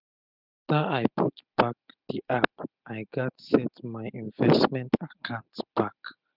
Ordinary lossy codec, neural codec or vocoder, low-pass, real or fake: none; none; 5.4 kHz; real